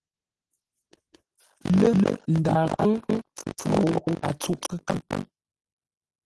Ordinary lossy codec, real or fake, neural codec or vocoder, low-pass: Opus, 16 kbps; real; none; 10.8 kHz